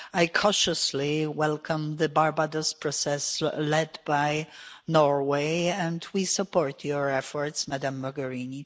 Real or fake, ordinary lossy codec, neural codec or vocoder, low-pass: real; none; none; none